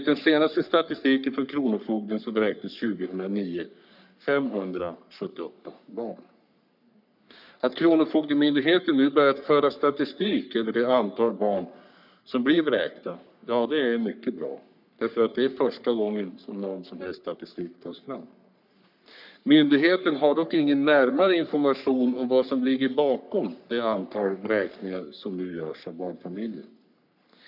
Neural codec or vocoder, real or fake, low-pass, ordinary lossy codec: codec, 44.1 kHz, 3.4 kbps, Pupu-Codec; fake; 5.4 kHz; none